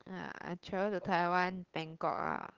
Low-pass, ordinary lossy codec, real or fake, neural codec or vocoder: 7.2 kHz; Opus, 16 kbps; fake; codec, 16 kHz, 8 kbps, FunCodec, trained on LibriTTS, 25 frames a second